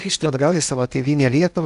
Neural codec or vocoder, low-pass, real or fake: codec, 16 kHz in and 24 kHz out, 0.8 kbps, FocalCodec, streaming, 65536 codes; 10.8 kHz; fake